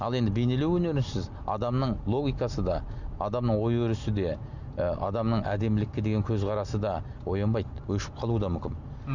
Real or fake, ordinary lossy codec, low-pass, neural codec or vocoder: real; none; 7.2 kHz; none